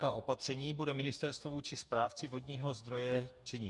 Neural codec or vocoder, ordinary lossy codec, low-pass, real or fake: codec, 44.1 kHz, 2.6 kbps, DAC; MP3, 96 kbps; 10.8 kHz; fake